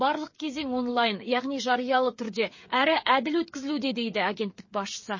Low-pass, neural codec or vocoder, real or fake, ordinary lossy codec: 7.2 kHz; codec, 16 kHz, 8 kbps, FreqCodec, smaller model; fake; MP3, 32 kbps